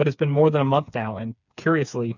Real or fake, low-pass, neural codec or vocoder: fake; 7.2 kHz; codec, 16 kHz, 4 kbps, FreqCodec, smaller model